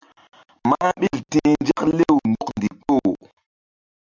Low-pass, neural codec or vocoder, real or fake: 7.2 kHz; none; real